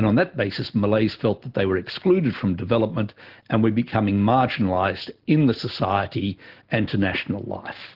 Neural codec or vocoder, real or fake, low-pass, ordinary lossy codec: none; real; 5.4 kHz; Opus, 16 kbps